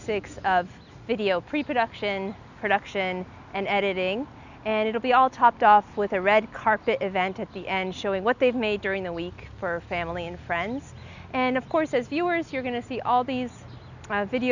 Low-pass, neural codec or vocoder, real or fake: 7.2 kHz; none; real